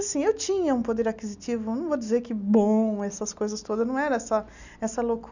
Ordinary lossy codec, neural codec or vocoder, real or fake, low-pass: none; none; real; 7.2 kHz